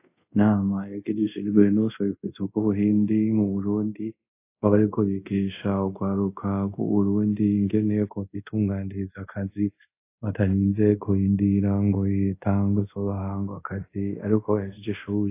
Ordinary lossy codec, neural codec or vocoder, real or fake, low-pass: AAC, 24 kbps; codec, 24 kHz, 0.5 kbps, DualCodec; fake; 3.6 kHz